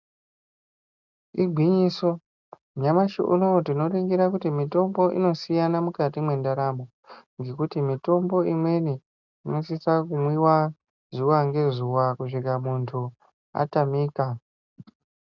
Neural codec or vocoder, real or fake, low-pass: none; real; 7.2 kHz